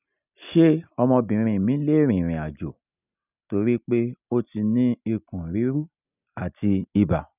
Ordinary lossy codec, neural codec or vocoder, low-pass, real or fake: none; none; 3.6 kHz; real